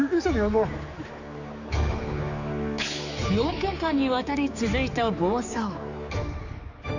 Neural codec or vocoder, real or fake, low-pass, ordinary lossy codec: codec, 16 kHz, 4 kbps, X-Codec, HuBERT features, trained on general audio; fake; 7.2 kHz; none